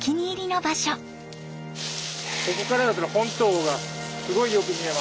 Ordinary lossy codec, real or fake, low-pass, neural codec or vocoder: none; real; none; none